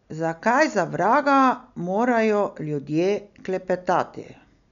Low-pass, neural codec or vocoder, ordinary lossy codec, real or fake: 7.2 kHz; none; none; real